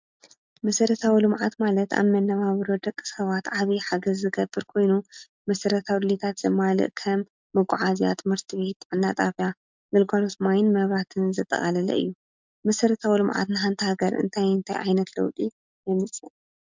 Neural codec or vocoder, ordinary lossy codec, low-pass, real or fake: none; MP3, 64 kbps; 7.2 kHz; real